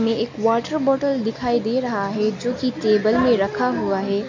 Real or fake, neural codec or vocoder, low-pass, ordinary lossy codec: real; none; 7.2 kHz; MP3, 64 kbps